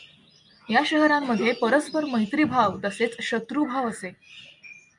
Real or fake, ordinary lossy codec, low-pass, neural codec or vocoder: real; MP3, 96 kbps; 10.8 kHz; none